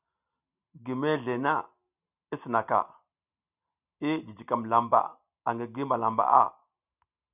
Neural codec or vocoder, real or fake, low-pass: none; real; 3.6 kHz